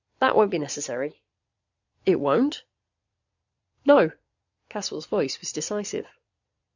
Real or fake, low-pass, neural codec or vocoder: fake; 7.2 kHz; vocoder, 22.05 kHz, 80 mel bands, Vocos